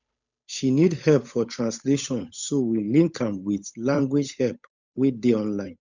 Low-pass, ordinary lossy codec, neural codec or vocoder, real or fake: 7.2 kHz; none; codec, 16 kHz, 8 kbps, FunCodec, trained on Chinese and English, 25 frames a second; fake